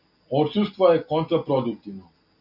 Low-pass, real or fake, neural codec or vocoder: 5.4 kHz; real; none